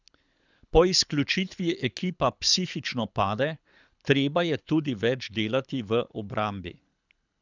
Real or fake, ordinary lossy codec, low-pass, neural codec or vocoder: fake; none; 7.2 kHz; codec, 24 kHz, 6 kbps, HILCodec